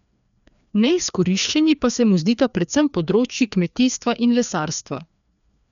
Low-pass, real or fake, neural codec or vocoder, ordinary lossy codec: 7.2 kHz; fake; codec, 16 kHz, 2 kbps, FreqCodec, larger model; none